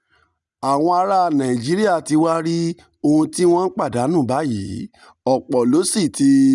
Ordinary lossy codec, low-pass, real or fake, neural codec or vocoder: none; 10.8 kHz; real; none